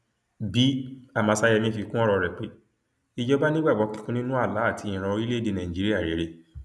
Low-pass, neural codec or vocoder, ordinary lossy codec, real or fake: none; none; none; real